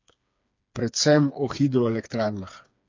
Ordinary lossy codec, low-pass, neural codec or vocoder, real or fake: MP3, 48 kbps; 7.2 kHz; codec, 16 kHz, 4 kbps, FreqCodec, smaller model; fake